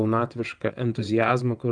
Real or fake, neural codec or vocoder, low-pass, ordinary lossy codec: fake; vocoder, 22.05 kHz, 80 mel bands, WaveNeXt; 9.9 kHz; Opus, 32 kbps